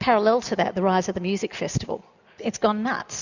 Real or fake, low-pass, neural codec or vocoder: real; 7.2 kHz; none